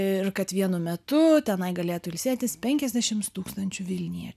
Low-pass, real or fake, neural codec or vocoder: 14.4 kHz; real; none